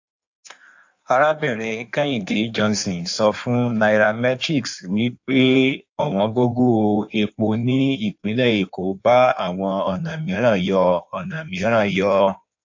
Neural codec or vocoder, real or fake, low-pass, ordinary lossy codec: codec, 16 kHz in and 24 kHz out, 1.1 kbps, FireRedTTS-2 codec; fake; 7.2 kHz; AAC, 48 kbps